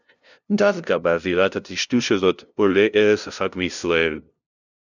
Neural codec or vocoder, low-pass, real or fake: codec, 16 kHz, 0.5 kbps, FunCodec, trained on LibriTTS, 25 frames a second; 7.2 kHz; fake